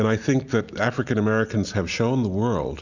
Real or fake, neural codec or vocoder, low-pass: real; none; 7.2 kHz